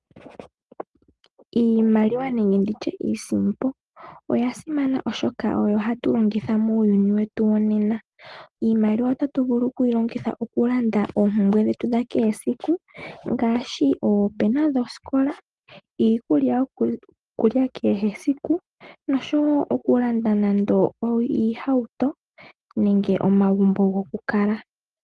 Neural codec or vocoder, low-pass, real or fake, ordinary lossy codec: none; 10.8 kHz; real; Opus, 24 kbps